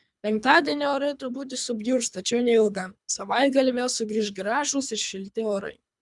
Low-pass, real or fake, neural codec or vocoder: 10.8 kHz; fake; codec, 24 kHz, 3 kbps, HILCodec